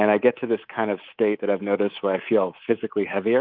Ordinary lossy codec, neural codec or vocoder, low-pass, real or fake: Opus, 32 kbps; codec, 24 kHz, 3.1 kbps, DualCodec; 5.4 kHz; fake